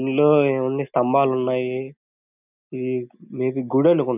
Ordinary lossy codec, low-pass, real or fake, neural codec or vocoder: none; 3.6 kHz; real; none